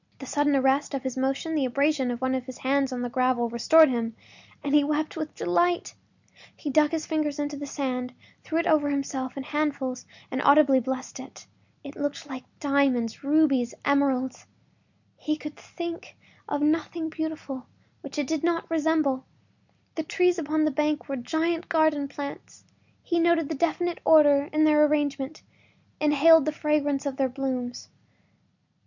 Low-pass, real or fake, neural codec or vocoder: 7.2 kHz; real; none